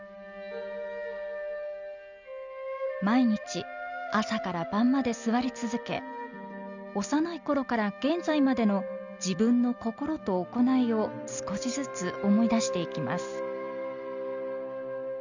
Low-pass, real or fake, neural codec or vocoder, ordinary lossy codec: 7.2 kHz; real; none; none